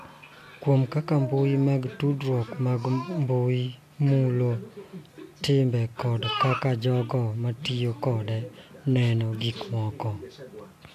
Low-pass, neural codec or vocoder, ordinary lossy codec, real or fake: 14.4 kHz; none; AAC, 64 kbps; real